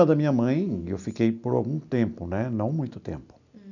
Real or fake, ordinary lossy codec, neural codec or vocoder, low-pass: real; none; none; 7.2 kHz